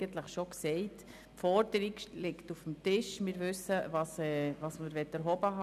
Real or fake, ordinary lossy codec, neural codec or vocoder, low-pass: real; none; none; 14.4 kHz